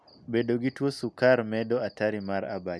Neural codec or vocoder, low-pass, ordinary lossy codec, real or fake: none; none; none; real